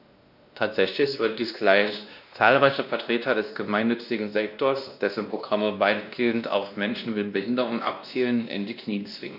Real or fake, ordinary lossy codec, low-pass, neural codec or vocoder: fake; none; 5.4 kHz; codec, 16 kHz, 1 kbps, X-Codec, WavLM features, trained on Multilingual LibriSpeech